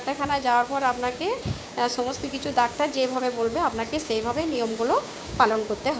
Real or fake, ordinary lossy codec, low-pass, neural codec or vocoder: fake; none; none; codec, 16 kHz, 6 kbps, DAC